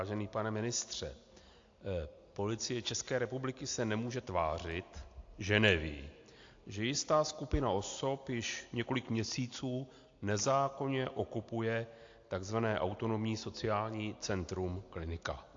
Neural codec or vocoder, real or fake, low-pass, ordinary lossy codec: none; real; 7.2 kHz; AAC, 48 kbps